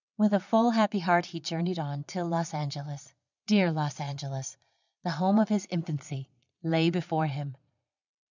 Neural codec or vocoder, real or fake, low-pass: vocoder, 22.05 kHz, 80 mel bands, Vocos; fake; 7.2 kHz